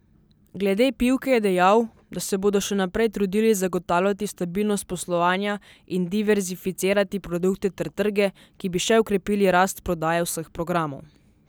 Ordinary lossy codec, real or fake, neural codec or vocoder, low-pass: none; real; none; none